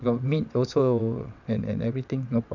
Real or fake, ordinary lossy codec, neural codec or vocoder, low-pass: fake; none; vocoder, 22.05 kHz, 80 mel bands, WaveNeXt; 7.2 kHz